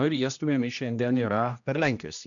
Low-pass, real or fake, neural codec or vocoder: 7.2 kHz; fake; codec, 16 kHz, 1 kbps, X-Codec, HuBERT features, trained on general audio